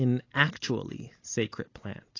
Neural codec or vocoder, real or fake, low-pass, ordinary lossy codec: none; real; 7.2 kHz; AAC, 32 kbps